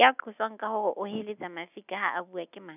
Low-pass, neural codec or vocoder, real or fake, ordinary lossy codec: 3.6 kHz; none; real; none